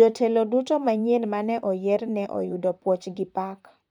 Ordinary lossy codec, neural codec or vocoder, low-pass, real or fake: none; vocoder, 44.1 kHz, 128 mel bands, Pupu-Vocoder; 19.8 kHz; fake